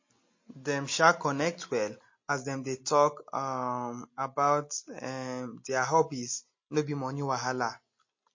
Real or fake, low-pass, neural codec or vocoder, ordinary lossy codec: real; 7.2 kHz; none; MP3, 32 kbps